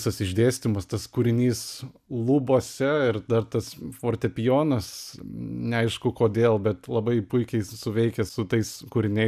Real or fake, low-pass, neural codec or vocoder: real; 14.4 kHz; none